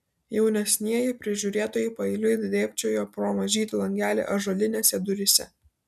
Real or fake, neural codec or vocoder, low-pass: real; none; 14.4 kHz